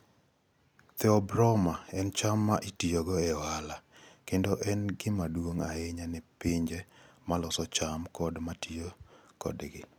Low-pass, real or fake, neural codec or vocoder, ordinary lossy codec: none; real; none; none